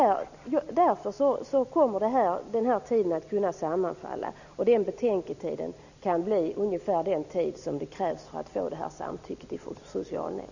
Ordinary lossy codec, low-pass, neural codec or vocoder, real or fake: none; 7.2 kHz; none; real